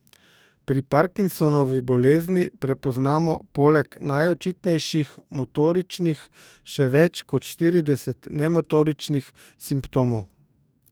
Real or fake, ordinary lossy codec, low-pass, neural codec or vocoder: fake; none; none; codec, 44.1 kHz, 2.6 kbps, DAC